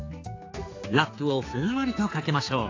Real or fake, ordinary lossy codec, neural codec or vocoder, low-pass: fake; AAC, 32 kbps; codec, 16 kHz, 4 kbps, X-Codec, HuBERT features, trained on general audio; 7.2 kHz